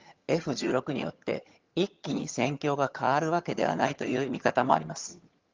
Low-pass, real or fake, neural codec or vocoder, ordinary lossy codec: 7.2 kHz; fake; vocoder, 22.05 kHz, 80 mel bands, HiFi-GAN; Opus, 32 kbps